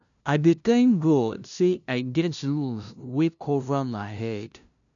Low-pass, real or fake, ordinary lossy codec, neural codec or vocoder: 7.2 kHz; fake; none; codec, 16 kHz, 0.5 kbps, FunCodec, trained on LibriTTS, 25 frames a second